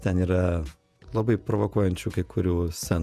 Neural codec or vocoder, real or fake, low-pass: vocoder, 48 kHz, 128 mel bands, Vocos; fake; 14.4 kHz